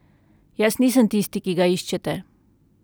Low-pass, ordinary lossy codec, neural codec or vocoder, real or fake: none; none; none; real